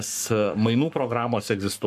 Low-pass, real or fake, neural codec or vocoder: 14.4 kHz; fake; codec, 44.1 kHz, 7.8 kbps, Pupu-Codec